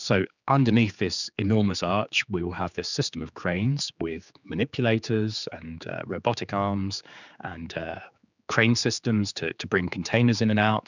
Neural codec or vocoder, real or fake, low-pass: codec, 16 kHz, 4 kbps, X-Codec, HuBERT features, trained on general audio; fake; 7.2 kHz